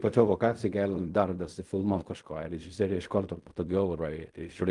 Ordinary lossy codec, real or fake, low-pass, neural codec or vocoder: Opus, 32 kbps; fake; 10.8 kHz; codec, 16 kHz in and 24 kHz out, 0.4 kbps, LongCat-Audio-Codec, fine tuned four codebook decoder